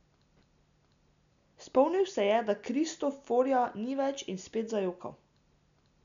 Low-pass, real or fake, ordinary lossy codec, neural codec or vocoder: 7.2 kHz; real; none; none